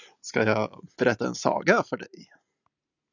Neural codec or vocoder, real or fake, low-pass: none; real; 7.2 kHz